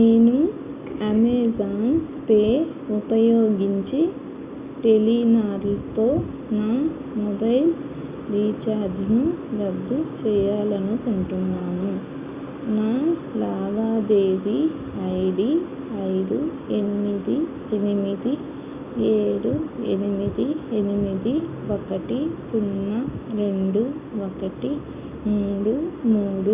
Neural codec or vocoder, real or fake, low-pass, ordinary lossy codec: none; real; 3.6 kHz; Opus, 64 kbps